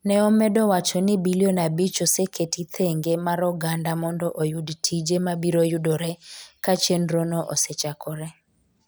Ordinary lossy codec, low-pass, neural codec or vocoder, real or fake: none; none; none; real